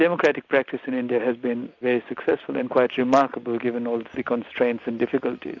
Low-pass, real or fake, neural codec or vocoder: 7.2 kHz; real; none